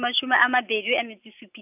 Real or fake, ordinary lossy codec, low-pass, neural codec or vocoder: real; none; 3.6 kHz; none